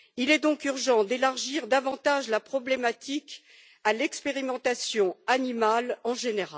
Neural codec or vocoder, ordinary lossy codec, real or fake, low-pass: none; none; real; none